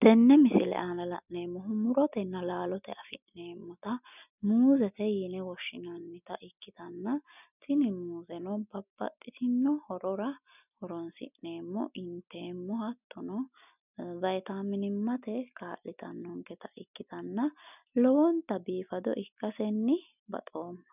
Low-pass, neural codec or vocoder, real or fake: 3.6 kHz; none; real